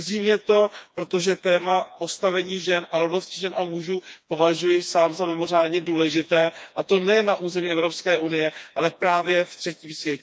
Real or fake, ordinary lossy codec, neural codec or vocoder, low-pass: fake; none; codec, 16 kHz, 2 kbps, FreqCodec, smaller model; none